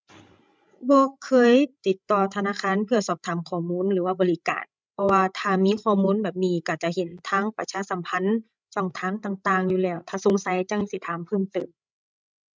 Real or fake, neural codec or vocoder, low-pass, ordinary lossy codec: fake; codec, 16 kHz, 16 kbps, FreqCodec, larger model; none; none